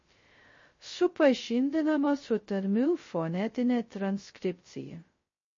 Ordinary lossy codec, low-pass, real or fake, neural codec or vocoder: MP3, 32 kbps; 7.2 kHz; fake; codec, 16 kHz, 0.2 kbps, FocalCodec